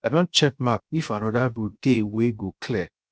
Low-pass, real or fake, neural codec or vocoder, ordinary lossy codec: none; fake; codec, 16 kHz, 0.7 kbps, FocalCodec; none